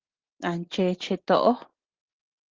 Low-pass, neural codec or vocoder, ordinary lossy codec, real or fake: 7.2 kHz; none; Opus, 16 kbps; real